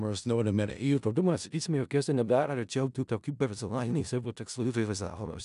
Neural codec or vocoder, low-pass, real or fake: codec, 16 kHz in and 24 kHz out, 0.4 kbps, LongCat-Audio-Codec, four codebook decoder; 10.8 kHz; fake